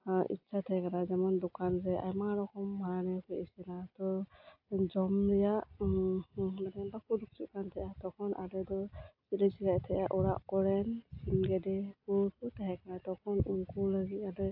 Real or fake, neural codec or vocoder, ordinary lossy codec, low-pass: real; none; AAC, 48 kbps; 5.4 kHz